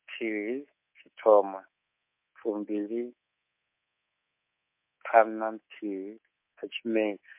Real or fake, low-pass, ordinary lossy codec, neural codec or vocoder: fake; 3.6 kHz; MP3, 32 kbps; codec, 24 kHz, 3.1 kbps, DualCodec